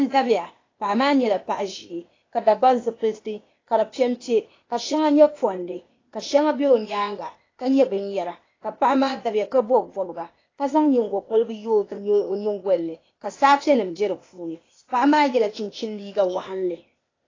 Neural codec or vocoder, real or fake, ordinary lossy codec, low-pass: codec, 16 kHz, 0.8 kbps, ZipCodec; fake; AAC, 32 kbps; 7.2 kHz